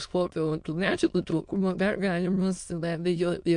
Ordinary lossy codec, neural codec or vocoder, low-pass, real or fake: MP3, 64 kbps; autoencoder, 22.05 kHz, a latent of 192 numbers a frame, VITS, trained on many speakers; 9.9 kHz; fake